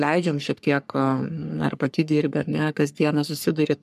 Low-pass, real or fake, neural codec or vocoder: 14.4 kHz; fake; codec, 44.1 kHz, 3.4 kbps, Pupu-Codec